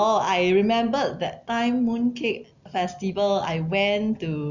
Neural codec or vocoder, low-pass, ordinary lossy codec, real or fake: none; 7.2 kHz; none; real